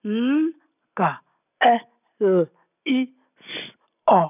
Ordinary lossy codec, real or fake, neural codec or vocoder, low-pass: none; real; none; 3.6 kHz